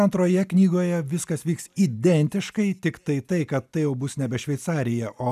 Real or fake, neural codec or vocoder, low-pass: real; none; 14.4 kHz